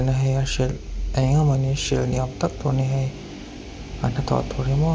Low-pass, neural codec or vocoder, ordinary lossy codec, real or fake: none; none; none; real